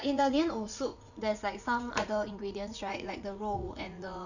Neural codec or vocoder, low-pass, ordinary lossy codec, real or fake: vocoder, 44.1 kHz, 80 mel bands, Vocos; 7.2 kHz; none; fake